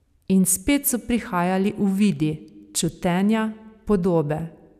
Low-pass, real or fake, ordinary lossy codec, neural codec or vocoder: 14.4 kHz; real; none; none